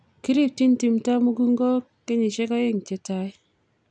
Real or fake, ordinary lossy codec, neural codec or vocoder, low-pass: real; none; none; 9.9 kHz